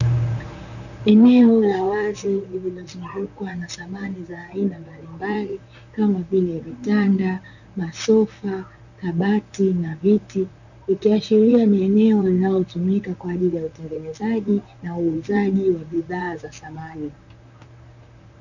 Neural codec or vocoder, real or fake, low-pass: vocoder, 44.1 kHz, 128 mel bands, Pupu-Vocoder; fake; 7.2 kHz